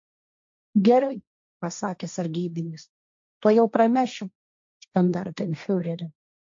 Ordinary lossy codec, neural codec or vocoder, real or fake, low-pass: MP3, 48 kbps; codec, 16 kHz, 1.1 kbps, Voila-Tokenizer; fake; 7.2 kHz